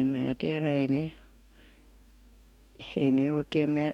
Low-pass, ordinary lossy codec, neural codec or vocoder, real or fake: 19.8 kHz; none; codec, 44.1 kHz, 2.6 kbps, DAC; fake